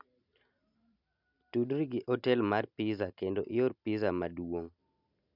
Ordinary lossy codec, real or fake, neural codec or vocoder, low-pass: none; real; none; 5.4 kHz